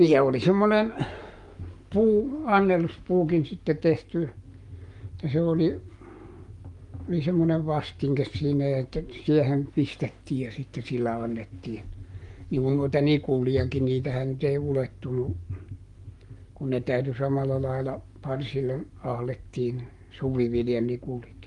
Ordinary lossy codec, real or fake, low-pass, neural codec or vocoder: Opus, 32 kbps; fake; 10.8 kHz; codec, 44.1 kHz, 7.8 kbps, Pupu-Codec